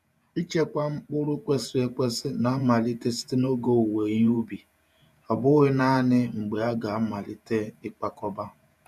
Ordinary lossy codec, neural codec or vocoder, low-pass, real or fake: none; vocoder, 48 kHz, 128 mel bands, Vocos; 14.4 kHz; fake